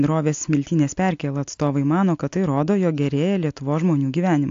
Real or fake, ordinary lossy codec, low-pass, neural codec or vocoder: real; AAC, 48 kbps; 7.2 kHz; none